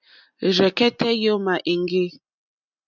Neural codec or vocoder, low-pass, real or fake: none; 7.2 kHz; real